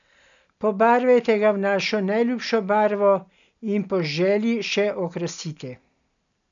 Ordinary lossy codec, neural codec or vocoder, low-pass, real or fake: none; none; 7.2 kHz; real